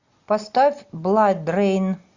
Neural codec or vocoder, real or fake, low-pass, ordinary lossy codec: none; real; 7.2 kHz; Opus, 64 kbps